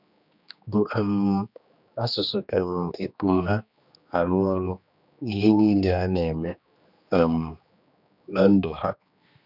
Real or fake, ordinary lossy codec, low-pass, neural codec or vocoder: fake; none; 5.4 kHz; codec, 16 kHz, 2 kbps, X-Codec, HuBERT features, trained on general audio